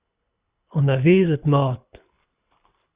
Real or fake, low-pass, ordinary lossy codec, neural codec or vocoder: fake; 3.6 kHz; Opus, 64 kbps; codec, 24 kHz, 6 kbps, HILCodec